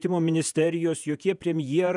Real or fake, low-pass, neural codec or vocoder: fake; 10.8 kHz; vocoder, 48 kHz, 128 mel bands, Vocos